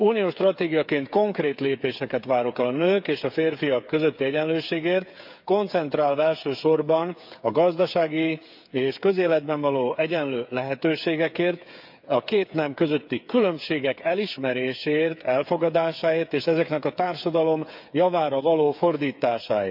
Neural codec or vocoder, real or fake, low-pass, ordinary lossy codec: codec, 16 kHz, 8 kbps, FreqCodec, smaller model; fake; 5.4 kHz; none